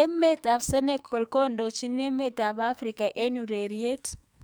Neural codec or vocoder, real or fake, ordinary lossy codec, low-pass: codec, 44.1 kHz, 2.6 kbps, SNAC; fake; none; none